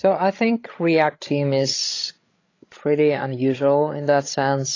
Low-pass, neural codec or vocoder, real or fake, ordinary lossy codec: 7.2 kHz; codec, 16 kHz, 16 kbps, FreqCodec, larger model; fake; AAC, 32 kbps